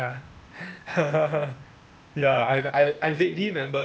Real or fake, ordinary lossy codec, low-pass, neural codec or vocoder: fake; none; none; codec, 16 kHz, 0.8 kbps, ZipCodec